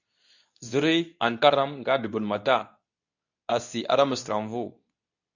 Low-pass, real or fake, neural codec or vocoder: 7.2 kHz; fake; codec, 24 kHz, 0.9 kbps, WavTokenizer, medium speech release version 2